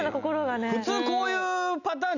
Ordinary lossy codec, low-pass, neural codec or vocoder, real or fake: none; 7.2 kHz; none; real